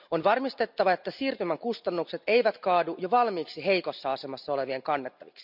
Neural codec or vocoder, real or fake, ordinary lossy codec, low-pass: none; real; none; 5.4 kHz